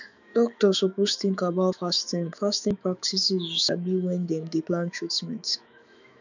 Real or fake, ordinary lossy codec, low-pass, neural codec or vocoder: fake; none; 7.2 kHz; autoencoder, 48 kHz, 128 numbers a frame, DAC-VAE, trained on Japanese speech